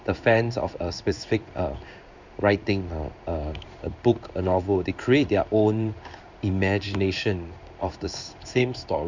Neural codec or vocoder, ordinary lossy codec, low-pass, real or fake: codec, 16 kHz in and 24 kHz out, 1 kbps, XY-Tokenizer; none; 7.2 kHz; fake